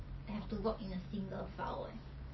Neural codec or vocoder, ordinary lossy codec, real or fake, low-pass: none; MP3, 24 kbps; real; 7.2 kHz